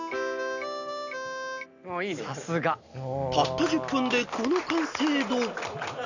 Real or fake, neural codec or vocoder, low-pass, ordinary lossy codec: real; none; 7.2 kHz; none